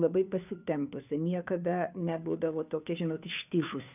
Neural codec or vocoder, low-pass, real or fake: codec, 16 kHz, 2 kbps, FunCodec, trained on Chinese and English, 25 frames a second; 3.6 kHz; fake